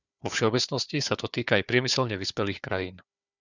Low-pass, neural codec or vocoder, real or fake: 7.2 kHz; codec, 16 kHz, 4 kbps, FunCodec, trained on Chinese and English, 50 frames a second; fake